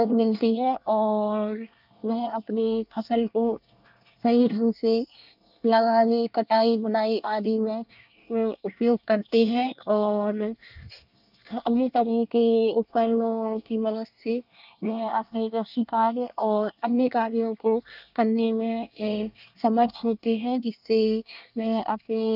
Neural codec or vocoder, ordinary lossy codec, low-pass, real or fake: codec, 24 kHz, 1 kbps, SNAC; none; 5.4 kHz; fake